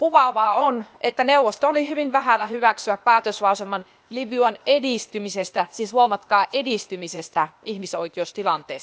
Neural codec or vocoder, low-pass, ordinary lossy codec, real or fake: codec, 16 kHz, 0.8 kbps, ZipCodec; none; none; fake